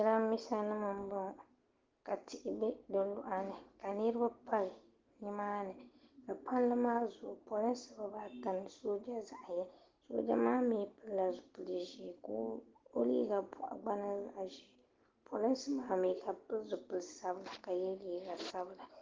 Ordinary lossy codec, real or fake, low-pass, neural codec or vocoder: Opus, 16 kbps; real; 7.2 kHz; none